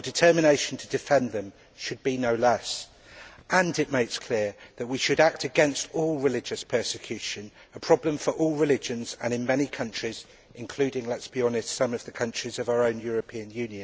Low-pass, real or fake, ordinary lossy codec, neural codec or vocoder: none; real; none; none